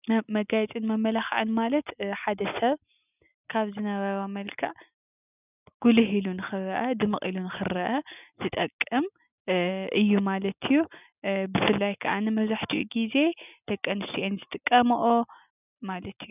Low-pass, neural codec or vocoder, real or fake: 3.6 kHz; none; real